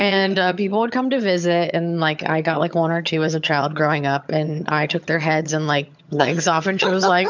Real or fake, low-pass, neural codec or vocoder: fake; 7.2 kHz; vocoder, 22.05 kHz, 80 mel bands, HiFi-GAN